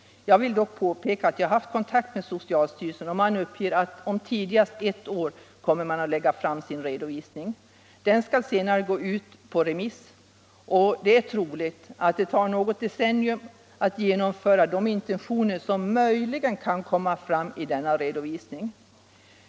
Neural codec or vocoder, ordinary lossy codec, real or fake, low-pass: none; none; real; none